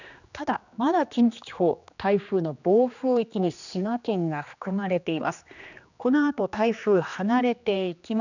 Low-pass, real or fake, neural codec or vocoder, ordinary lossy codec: 7.2 kHz; fake; codec, 16 kHz, 2 kbps, X-Codec, HuBERT features, trained on general audio; none